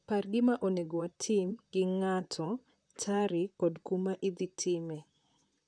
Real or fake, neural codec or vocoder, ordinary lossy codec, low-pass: fake; vocoder, 44.1 kHz, 128 mel bands, Pupu-Vocoder; none; 9.9 kHz